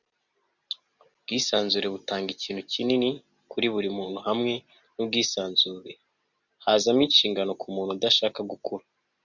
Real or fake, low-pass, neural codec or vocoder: real; 7.2 kHz; none